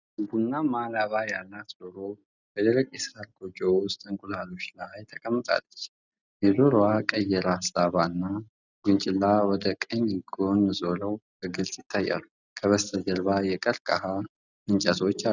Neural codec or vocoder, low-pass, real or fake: none; 7.2 kHz; real